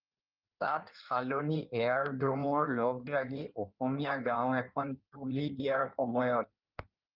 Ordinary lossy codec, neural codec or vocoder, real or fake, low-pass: Opus, 32 kbps; codec, 16 kHz in and 24 kHz out, 1.1 kbps, FireRedTTS-2 codec; fake; 5.4 kHz